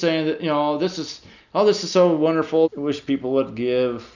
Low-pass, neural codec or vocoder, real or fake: 7.2 kHz; none; real